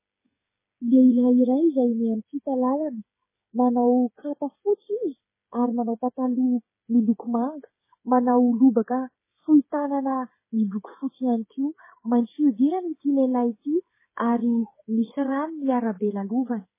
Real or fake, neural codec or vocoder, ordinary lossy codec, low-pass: fake; codec, 16 kHz, 8 kbps, FreqCodec, smaller model; MP3, 16 kbps; 3.6 kHz